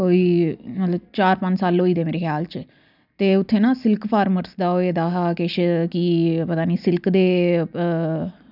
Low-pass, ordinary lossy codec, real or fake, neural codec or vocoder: 5.4 kHz; none; real; none